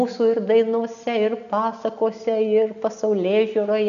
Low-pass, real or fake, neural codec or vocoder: 7.2 kHz; real; none